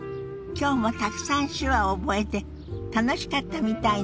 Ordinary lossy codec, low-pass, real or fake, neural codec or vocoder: none; none; real; none